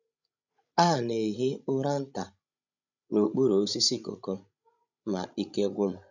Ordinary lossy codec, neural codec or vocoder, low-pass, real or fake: none; codec, 16 kHz, 16 kbps, FreqCodec, larger model; 7.2 kHz; fake